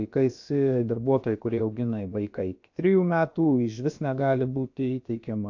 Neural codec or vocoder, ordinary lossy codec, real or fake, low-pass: codec, 16 kHz, about 1 kbps, DyCAST, with the encoder's durations; AAC, 48 kbps; fake; 7.2 kHz